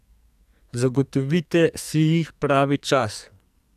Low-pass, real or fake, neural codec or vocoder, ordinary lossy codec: 14.4 kHz; fake; codec, 44.1 kHz, 2.6 kbps, SNAC; none